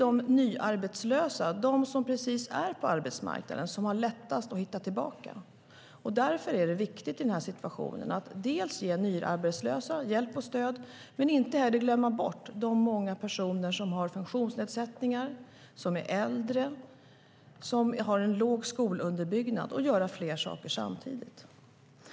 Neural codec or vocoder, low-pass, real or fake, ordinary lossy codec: none; none; real; none